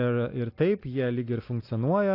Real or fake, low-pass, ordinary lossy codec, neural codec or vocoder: real; 5.4 kHz; AAC, 32 kbps; none